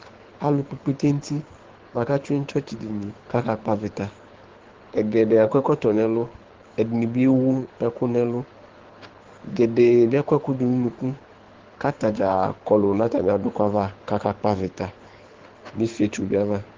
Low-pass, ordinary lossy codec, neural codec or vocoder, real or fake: 7.2 kHz; Opus, 16 kbps; codec, 24 kHz, 6 kbps, HILCodec; fake